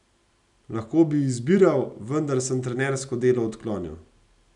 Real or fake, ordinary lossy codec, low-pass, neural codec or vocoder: real; none; 10.8 kHz; none